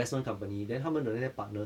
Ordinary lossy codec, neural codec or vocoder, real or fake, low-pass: none; none; real; 19.8 kHz